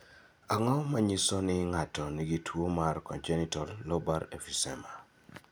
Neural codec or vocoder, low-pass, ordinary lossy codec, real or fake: none; none; none; real